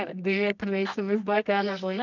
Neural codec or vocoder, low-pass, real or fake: codec, 24 kHz, 0.9 kbps, WavTokenizer, medium music audio release; 7.2 kHz; fake